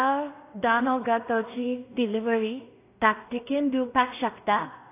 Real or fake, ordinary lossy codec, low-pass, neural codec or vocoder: fake; AAC, 24 kbps; 3.6 kHz; codec, 16 kHz in and 24 kHz out, 0.4 kbps, LongCat-Audio-Codec, two codebook decoder